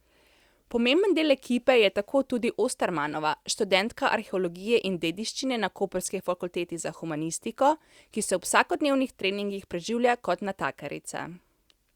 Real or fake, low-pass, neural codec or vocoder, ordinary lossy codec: real; 19.8 kHz; none; Opus, 64 kbps